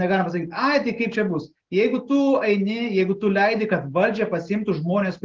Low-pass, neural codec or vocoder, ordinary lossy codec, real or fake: 7.2 kHz; none; Opus, 16 kbps; real